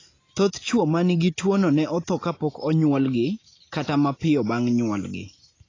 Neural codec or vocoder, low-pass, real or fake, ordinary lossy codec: none; 7.2 kHz; real; AAC, 32 kbps